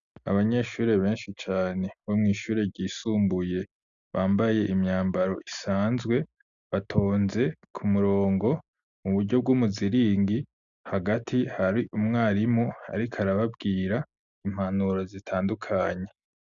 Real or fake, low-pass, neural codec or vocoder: real; 7.2 kHz; none